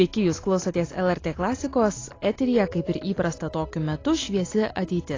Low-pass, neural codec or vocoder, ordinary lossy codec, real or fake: 7.2 kHz; none; AAC, 32 kbps; real